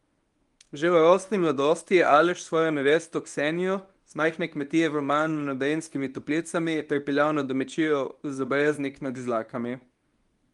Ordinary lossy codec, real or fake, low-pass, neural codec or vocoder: Opus, 32 kbps; fake; 10.8 kHz; codec, 24 kHz, 0.9 kbps, WavTokenizer, medium speech release version 1